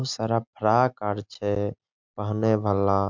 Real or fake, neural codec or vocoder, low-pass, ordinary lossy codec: real; none; 7.2 kHz; MP3, 64 kbps